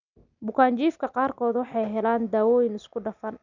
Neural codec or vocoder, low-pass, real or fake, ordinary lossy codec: none; 7.2 kHz; real; none